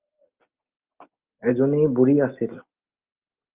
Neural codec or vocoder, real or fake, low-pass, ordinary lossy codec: none; real; 3.6 kHz; Opus, 24 kbps